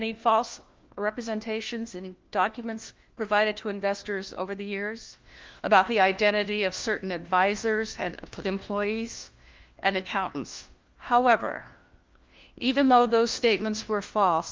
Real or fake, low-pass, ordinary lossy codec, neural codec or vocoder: fake; 7.2 kHz; Opus, 32 kbps; codec, 16 kHz, 1 kbps, FunCodec, trained on LibriTTS, 50 frames a second